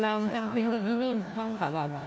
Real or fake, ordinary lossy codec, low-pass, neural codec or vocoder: fake; none; none; codec, 16 kHz, 1 kbps, FunCodec, trained on LibriTTS, 50 frames a second